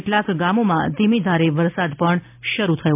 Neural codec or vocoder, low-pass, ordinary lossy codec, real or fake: none; 3.6 kHz; MP3, 32 kbps; real